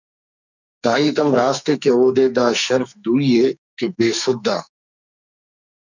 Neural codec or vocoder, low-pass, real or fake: codec, 44.1 kHz, 2.6 kbps, SNAC; 7.2 kHz; fake